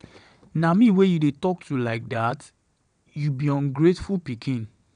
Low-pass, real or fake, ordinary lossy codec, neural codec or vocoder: 9.9 kHz; fake; none; vocoder, 22.05 kHz, 80 mel bands, WaveNeXt